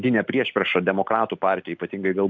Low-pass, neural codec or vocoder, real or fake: 7.2 kHz; none; real